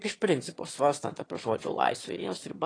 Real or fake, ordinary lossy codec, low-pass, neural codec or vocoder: fake; AAC, 32 kbps; 9.9 kHz; autoencoder, 22.05 kHz, a latent of 192 numbers a frame, VITS, trained on one speaker